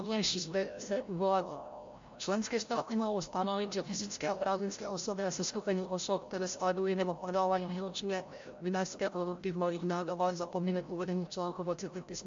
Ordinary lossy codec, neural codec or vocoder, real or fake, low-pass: MP3, 48 kbps; codec, 16 kHz, 0.5 kbps, FreqCodec, larger model; fake; 7.2 kHz